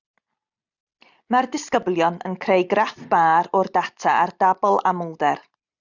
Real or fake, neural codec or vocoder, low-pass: real; none; 7.2 kHz